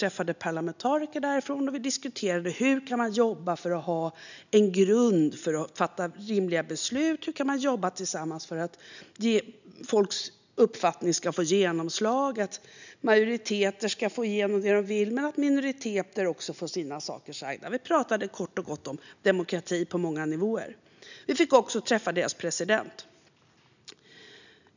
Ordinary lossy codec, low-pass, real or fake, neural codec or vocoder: none; 7.2 kHz; real; none